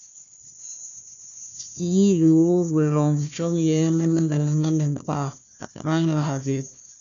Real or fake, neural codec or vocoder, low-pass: fake; codec, 16 kHz, 1 kbps, FunCodec, trained on Chinese and English, 50 frames a second; 7.2 kHz